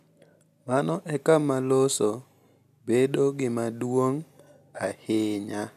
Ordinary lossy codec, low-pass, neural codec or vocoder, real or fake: none; 14.4 kHz; none; real